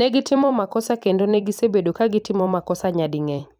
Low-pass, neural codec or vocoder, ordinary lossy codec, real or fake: 19.8 kHz; vocoder, 44.1 kHz, 128 mel bands every 256 samples, BigVGAN v2; none; fake